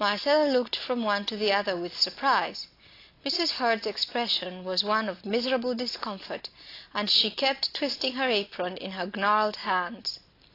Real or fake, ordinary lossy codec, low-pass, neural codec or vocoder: real; AAC, 32 kbps; 5.4 kHz; none